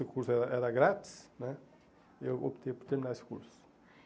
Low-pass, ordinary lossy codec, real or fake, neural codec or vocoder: none; none; real; none